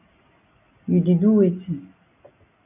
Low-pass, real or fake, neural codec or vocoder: 3.6 kHz; real; none